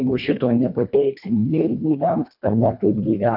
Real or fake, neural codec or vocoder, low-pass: fake; codec, 24 kHz, 1.5 kbps, HILCodec; 5.4 kHz